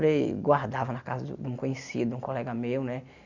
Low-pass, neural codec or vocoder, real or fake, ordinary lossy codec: 7.2 kHz; none; real; none